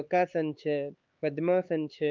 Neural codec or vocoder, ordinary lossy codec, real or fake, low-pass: codec, 16 kHz, 4 kbps, X-Codec, HuBERT features, trained on LibriSpeech; Opus, 32 kbps; fake; 7.2 kHz